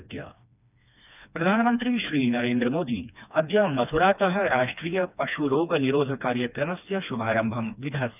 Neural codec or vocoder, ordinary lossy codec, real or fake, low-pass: codec, 16 kHz, 2 kbps, FreqCodec, smaller model; none; fake; 3.6 kHz